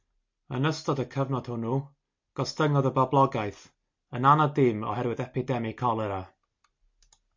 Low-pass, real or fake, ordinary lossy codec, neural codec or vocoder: 7.2 kHz; real; MP3, 48 kbps; none